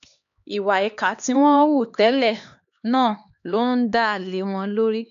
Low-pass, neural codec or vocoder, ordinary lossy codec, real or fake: 7.2 kHz; codec, 16 kHz, 2 kbps, X-Codec, HuBERT features, trained on LibriSpeech; none; fake